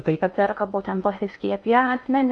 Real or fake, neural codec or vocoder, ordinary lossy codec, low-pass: fake; codec, 16 kHz in and 24 kHz out, 0.8 kbps, FocalCodec, streaming, 65536 codes; MP3, 96 kbps; 10.8 kHz